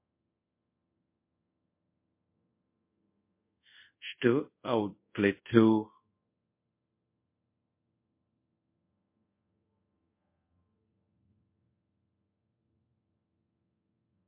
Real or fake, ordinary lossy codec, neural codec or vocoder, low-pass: fake; MP3, 24 kbps; codec, 24 kHz, 0.5 kbps, DualCodec; 3.6 kHz